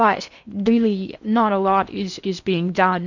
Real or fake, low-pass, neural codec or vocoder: fake; 7.2 kHz; codec, 16 kHz in and 24 kHz out, 0.6 kbps, FocalCodec, streaming, 4096 codes